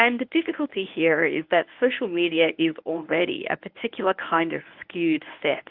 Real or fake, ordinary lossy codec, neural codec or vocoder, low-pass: fake; Opus, 24 kbps; codec, 24 kHz, 0.9 kbps, WavTokenizer, medium speech release version 1; 5.4 kHz